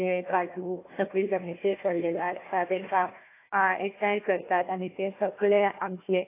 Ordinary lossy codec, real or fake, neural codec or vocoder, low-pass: AAC, 24 kbps; fake; codec, 16 kHz, 1 kbps, FunCodec, trained on Chinese and English, 50 frames a second; 3.6 kHz